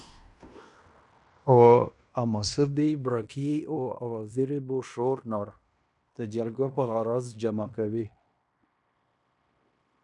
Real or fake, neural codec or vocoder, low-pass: fake; codec, 16 kHz in and 24 kHz out, 0.9 kbps, LongCat-Audio-Codec, fine tuned four codebook decoder; 10.8 kHz